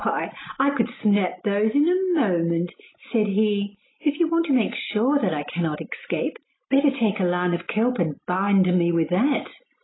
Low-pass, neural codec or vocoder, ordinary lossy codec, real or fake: 7.2 kHz; none; AAC, 16 kbps; real